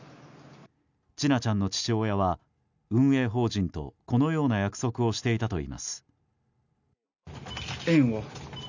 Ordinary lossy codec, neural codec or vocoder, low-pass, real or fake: none; none; 7.2 kHz; real